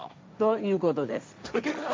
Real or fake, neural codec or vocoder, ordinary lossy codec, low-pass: fake; codec, 16 kHz, 1.1 kbps, Voila-Tokenizer; none; none